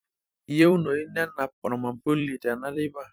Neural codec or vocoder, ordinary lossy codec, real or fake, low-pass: vocoder, 44.1 kHz, 128 mel bands every 256 samples, BigVGAN v2; none; fake; none